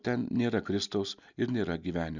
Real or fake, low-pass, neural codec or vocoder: real; 7.2 kHz; none